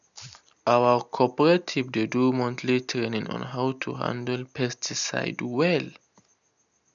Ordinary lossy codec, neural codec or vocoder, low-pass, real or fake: none; none; 7.2 kHz; real